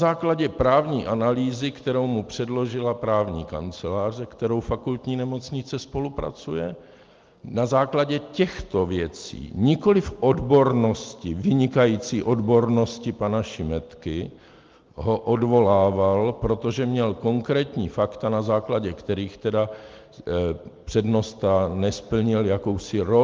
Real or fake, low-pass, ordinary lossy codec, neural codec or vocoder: real; 7.2 kHz; Opus, 32 kbps; none